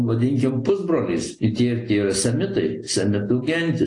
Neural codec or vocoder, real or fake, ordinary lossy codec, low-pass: none; real; AAC, 48 kbps; 10.8 kHz